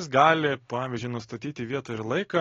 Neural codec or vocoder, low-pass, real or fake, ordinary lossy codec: none; 7.2 kHz; real; AAC, 32 kbps